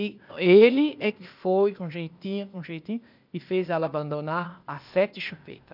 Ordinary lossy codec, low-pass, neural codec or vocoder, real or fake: none; 5.4 kHz; codec, 16 kHz, 0.8 kbps, ZipCodec; fake